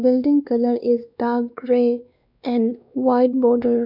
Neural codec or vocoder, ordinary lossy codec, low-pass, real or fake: codec, 16 kHz, 2 kbps, X-Codec, WavLM features, trained on Multilingual LibriSpeech; none; 5.4 kHz; fake